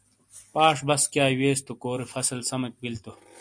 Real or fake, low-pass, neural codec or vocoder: real; 9.9 kHz; none